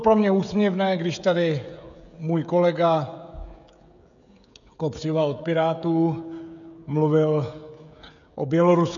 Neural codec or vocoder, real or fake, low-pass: codec, 16 kHz, 16 kbps, FreqCodec, smaller model; fake; 7.2 kHz